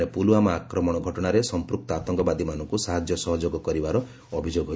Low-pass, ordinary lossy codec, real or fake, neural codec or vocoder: none; none; real; none